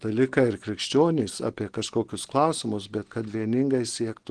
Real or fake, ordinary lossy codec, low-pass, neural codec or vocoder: real; Opus, 16 kbps; 9.9 kHz; none